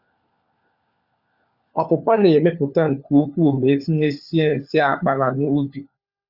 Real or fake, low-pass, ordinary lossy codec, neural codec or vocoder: fake; 5.4 kHz; Opus, 64 kbps; codec, 16 kHz, 4 kbps, FunCodec, trained on LibriTTS, 50 frames a second